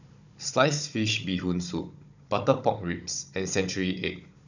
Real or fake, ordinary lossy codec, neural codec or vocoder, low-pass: fake; none; codec, 16 kHz, 16 kbps, FunCodec, trained on Chinese and English, 50 frames a second; 7.2 kHz